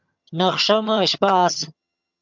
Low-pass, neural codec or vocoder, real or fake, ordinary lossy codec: 7.2 kHz; vocoder, 22.05 kHz, 80 mel bands, HiFi-GAN; fake; MP3, 64 kbps